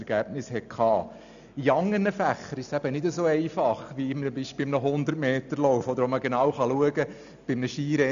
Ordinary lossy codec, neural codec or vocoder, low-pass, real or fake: none; none; 7.2 kHz; real